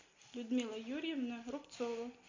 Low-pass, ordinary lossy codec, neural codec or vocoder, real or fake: 7.2 kHz; MP3, 64 kbps; none; real